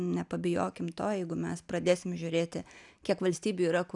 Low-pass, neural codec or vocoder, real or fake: 10.8 kHz; none; real